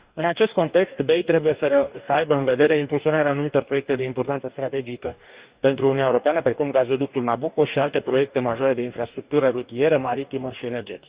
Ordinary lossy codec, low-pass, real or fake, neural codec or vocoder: Opus, 64 kbps; 3.6 kHz; fake; codec, 44.1 kHz, 2.6 kbps, DAC